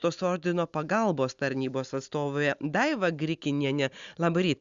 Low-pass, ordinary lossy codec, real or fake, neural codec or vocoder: 7.2 kHz; Opus, 64 kbps; real; none